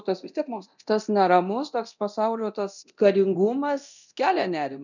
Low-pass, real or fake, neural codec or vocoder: 7.2 kHz; fake; codec, 24 kHz, 0.9 kbps, DualCodec